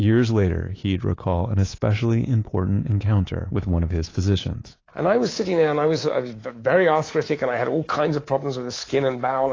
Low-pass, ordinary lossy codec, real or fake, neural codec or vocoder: 7.2 kHz; AAC, 32 kbps; fake; codec, 24 kHz, 3.1 kbps, DualCodec